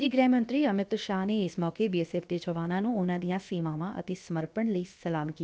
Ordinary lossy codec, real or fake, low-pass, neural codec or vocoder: none; fake; none; codec, 16 kHz, about 1 kbps, DyCAST, with the encoder's durations